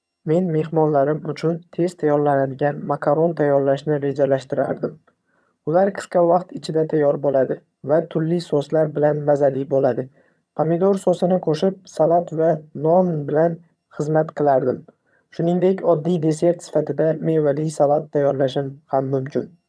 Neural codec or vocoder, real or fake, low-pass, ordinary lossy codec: vocoder, 22.05 kHz, 80 mel bands, HiFi-GAN; fake; none; none